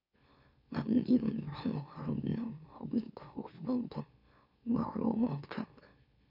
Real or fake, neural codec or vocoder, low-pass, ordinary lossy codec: fake; autoencoder, 44.1 kHz, a latent of 192 numbers a frame, MeloTTS; 5.4 kHz; none